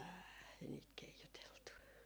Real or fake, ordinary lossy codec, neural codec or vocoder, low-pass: real; none; none; none